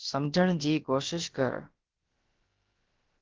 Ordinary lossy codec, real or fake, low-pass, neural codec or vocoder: Opus, 16 kbps; fake; 7.2 kHz; codec, 16 kHz, about 1 kbps, DyCAST, with the encoder's durations